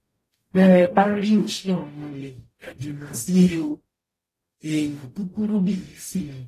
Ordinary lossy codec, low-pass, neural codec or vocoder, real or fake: AAC, 48 kbps; 14.4 kHz; codec, 44.1 kHz, 0.9 kbps, DAC; fake